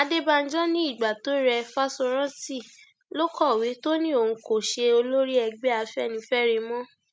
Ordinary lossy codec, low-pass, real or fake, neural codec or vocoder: none; none; real; none